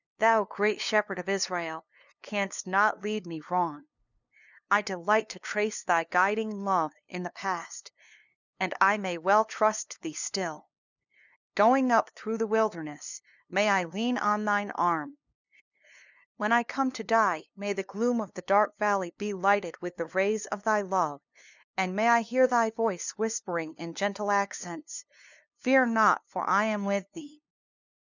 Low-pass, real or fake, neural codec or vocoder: 7.2 kHz; fake; codec, 16 kHz, 2 kbps, FunCodec, trained on LibriTTS, 25 frames a second